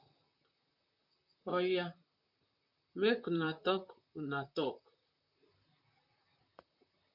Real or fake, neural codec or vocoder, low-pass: fake; vocoder, 44.1 kHz, 128 mel bands, Pupu-Vocoder; 5.4 kHz